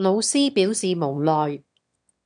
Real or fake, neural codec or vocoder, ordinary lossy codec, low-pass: fake; autoencoder, 22.05 kHz, a latent of 192 numbers a frame, VITS, trained on one speaker; MP3, 96 kbps; 9.9 kHz